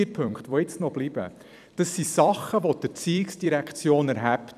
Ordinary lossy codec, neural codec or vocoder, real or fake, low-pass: none; vocoder, 44.1 kHz, 128 mel bands every 256 samples, BigVGAN v2; fake; 14.4 kHz